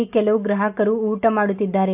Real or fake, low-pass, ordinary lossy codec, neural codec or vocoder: real; 3.6 kHz; AAC, 32 kbps; none